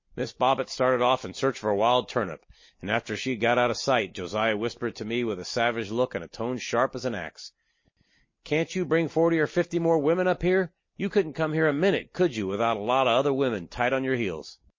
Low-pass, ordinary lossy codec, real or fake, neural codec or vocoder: 7.2 kHz; MP3, 32 kbps; real; none